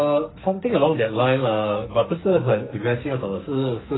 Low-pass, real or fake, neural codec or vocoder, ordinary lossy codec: 7.2 kHz; fake; codec, 44.1 kHz, 2.6 kbps, SNAC; AAC, 16 kbps